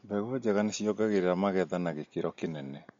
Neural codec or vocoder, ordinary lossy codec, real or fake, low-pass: none; MP3, 48 kbps; real; 7.2 kHz